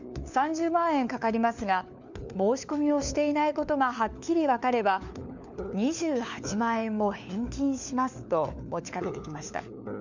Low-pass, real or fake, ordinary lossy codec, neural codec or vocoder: 7.2 kHz; fake; none; codec, 16 kHz, 4 kbps, FunCodec, trained on LibriTTS, 50 frames a second